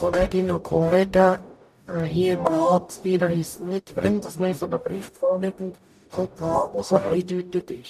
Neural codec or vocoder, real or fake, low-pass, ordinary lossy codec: codec, 44.1 kHz, 0.9 kbps, DAC; fake; 14.4 kHz; none